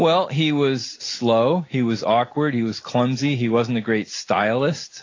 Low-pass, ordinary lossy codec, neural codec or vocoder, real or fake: 7.2 kHz; AAC, 32 kbps; none; real